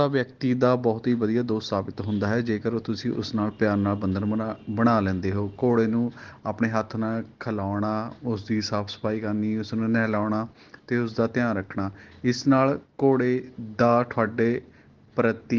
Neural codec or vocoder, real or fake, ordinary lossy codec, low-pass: none; real; Opus, 16 kbps; 7.2 kHz